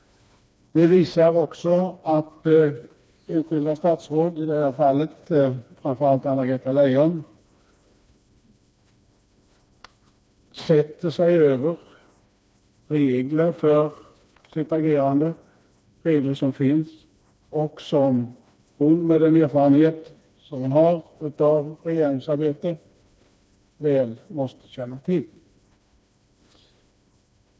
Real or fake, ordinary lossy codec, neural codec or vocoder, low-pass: fake; none; codec, 16 kHz, 2 kbps, FreqCodec, smaller model; none